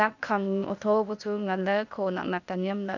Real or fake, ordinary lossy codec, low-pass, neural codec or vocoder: fake; none; 7.2 kHz; codec, 16 kHz, 0.8 kbps, ZipCodec